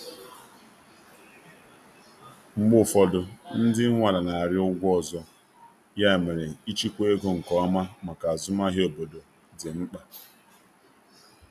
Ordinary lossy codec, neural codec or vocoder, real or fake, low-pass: none; none; real; 14.4 kHz